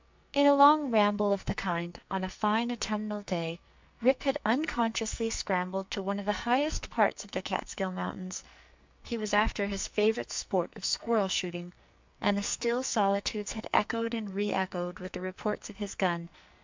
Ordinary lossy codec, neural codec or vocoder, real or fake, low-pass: MP3, 64 kbps; codec, 44.1 kHz, 2.6 kbps, SNAC; fake; 7.2 kHz